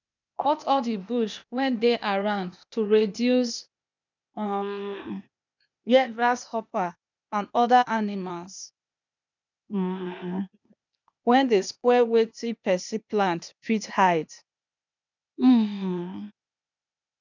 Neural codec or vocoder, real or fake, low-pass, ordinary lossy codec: codec, 16 kHz, 0.8 kbps, ZipCodec; fake; 7.2 kHz; none